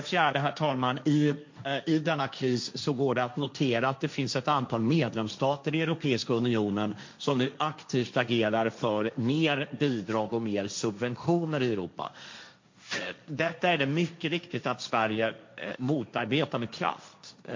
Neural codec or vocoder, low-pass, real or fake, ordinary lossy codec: codec, 16 kHz, 1.1 kbps, Voila-Tokenizer; 7.2 kHz; fake; MP3, 48 kbps